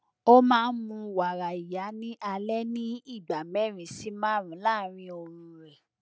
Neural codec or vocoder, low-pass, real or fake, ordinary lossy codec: none; none; real; none